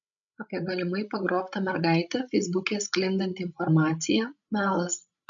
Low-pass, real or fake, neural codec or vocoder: 7.2 kHz; fake; codec, 16 kHz, 16 kbps, FreqCodec, larger model